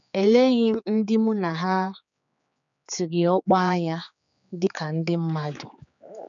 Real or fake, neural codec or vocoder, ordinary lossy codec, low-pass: fake; codec, 16 kHz, 4 kbps, X-Codec, HuBERT features, trained on general audio; none; 7.2 kHz